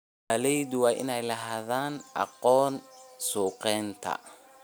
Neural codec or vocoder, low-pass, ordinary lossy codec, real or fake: none; none; none; real